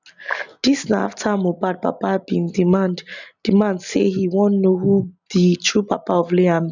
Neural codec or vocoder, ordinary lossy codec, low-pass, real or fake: none; none; 7.2 kHz; real